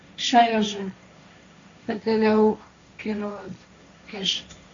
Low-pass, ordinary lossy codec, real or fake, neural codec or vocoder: 7.2 kHz; AAC, 64 kbps; fake; codec, 16 kHz, 1.1 kbps, Voila-Tokenizer